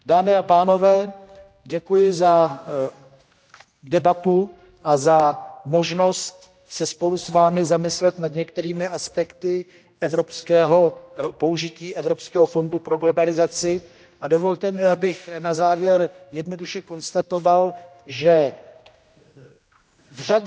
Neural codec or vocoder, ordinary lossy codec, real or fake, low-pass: codec, 16 kHz, 1 kbps, X-Codec, HuBERT features, trained on general audio; none; fake; none